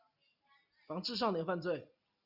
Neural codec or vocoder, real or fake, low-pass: none; real; 5.4 kHz